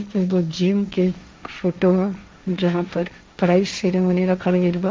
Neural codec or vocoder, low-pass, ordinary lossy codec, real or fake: codec, 16 kHz, 1.1 kbps, Voila-Tokenizer; none; none; fake